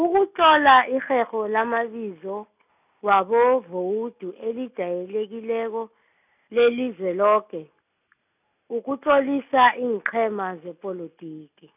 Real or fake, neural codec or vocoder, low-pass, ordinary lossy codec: real; none; 3.6 kHz; none